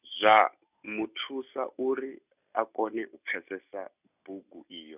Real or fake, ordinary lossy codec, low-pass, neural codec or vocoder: real; none; 3.6 kHz; none